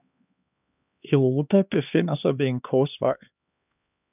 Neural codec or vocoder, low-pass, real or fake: codec, 16 kHz, 2 kbps, X-Codec, HuBERT features, trained on LibriSpeech; 3.6 kHz; fake